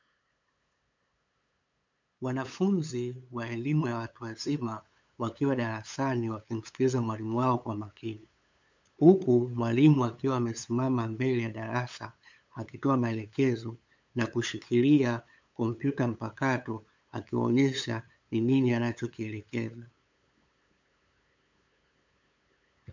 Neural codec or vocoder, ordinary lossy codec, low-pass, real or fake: codec, 16 kHz, 8 kbps, FunCodec, trained on LibriTTS, 25 frames a second; MP3, 64 kbps; 7.2 kHz; fake